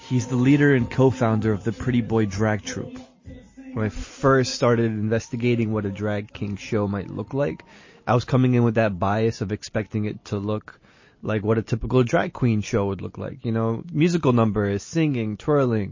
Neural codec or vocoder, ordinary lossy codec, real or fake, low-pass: none; MP3, 32 kbps; real; 7.2 kHz